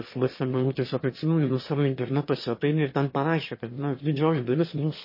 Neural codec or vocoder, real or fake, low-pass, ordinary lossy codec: autoencoder, 22.05 kHz, a latent of 192 numbers a frame, VITS, trained on one speaker; fake; 5.4 kHz; MP3, 24 kbps